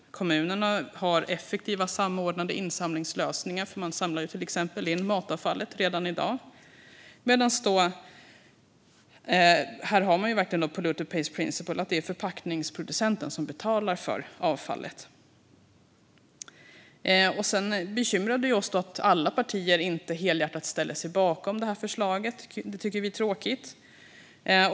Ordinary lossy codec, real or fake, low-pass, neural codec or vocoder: none; real; none; none